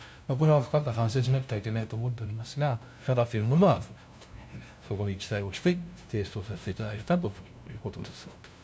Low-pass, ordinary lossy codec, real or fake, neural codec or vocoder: none; none; fake; codec, 16 kHz, 0.5 kbps, FunCodec, trained on LibriTTS, 25 frames a second